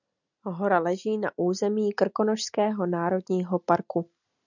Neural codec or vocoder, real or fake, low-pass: none; real; 7.2 kHz